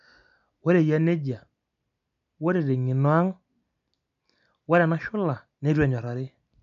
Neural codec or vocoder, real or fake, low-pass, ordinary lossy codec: none; real; 7.2 kHz; none